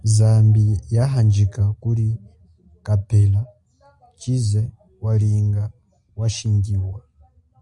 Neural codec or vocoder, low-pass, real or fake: none; 10.8 kHz; real